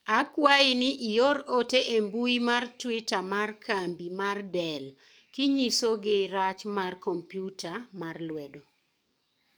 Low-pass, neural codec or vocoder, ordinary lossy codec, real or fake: none; codec, 44.1 kHz, 7.8 kbps, DAC; none; fake